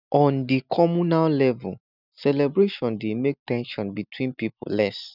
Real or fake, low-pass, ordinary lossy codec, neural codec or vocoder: real; 5.4 kHz; none; none